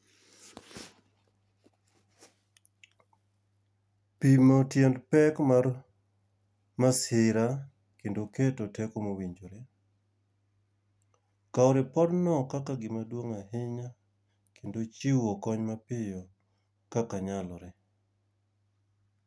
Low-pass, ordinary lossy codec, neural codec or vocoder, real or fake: none; none; none; real